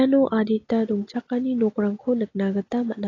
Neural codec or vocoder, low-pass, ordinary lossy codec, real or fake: none; 7.2 kHz; AAC, 32 kbps; real